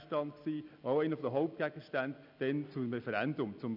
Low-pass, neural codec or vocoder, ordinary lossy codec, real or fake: 5.4 kHz; none; none; real